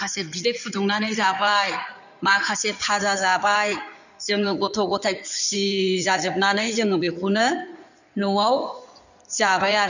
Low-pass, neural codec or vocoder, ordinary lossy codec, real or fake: 7.2 kHz; codec, 16 kHz in and 24 kHz out, 2.2 kbps, FireRedTTS-2 codec; none; fake